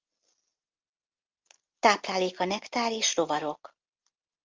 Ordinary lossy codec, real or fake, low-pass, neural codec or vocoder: Opus, 32 kbps; real; 7.2 kHz; none